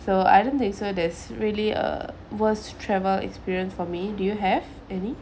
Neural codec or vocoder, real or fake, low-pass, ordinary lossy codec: none; real; none; none